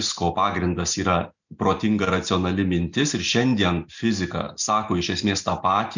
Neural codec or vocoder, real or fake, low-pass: none; real; 7.2 kHz